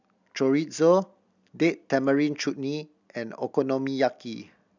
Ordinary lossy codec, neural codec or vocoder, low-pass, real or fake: none; none; 7.2 kHz; real